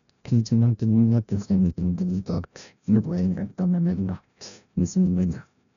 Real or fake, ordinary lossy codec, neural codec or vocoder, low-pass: fake; none; codec, 16 kHz, 0.5 kbps, FreqCodec, larger model; 7.2 kHz